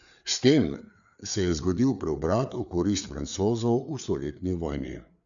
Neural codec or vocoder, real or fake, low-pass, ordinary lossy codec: codec, 16 kHz, 4 kbps, FreqCodec, larger model; fake; 7.2 kHz; none